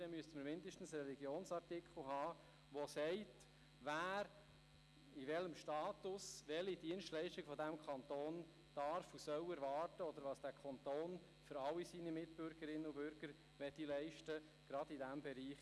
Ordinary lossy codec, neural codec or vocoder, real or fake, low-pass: none; none; real; none